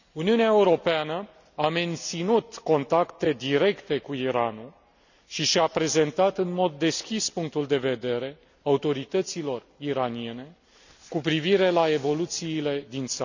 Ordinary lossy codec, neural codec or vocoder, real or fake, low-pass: none; none; real; 7.2 kHz